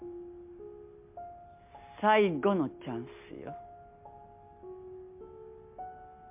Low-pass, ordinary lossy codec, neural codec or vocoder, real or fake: 3.6 kHz; MP3, 32 kbps; none; real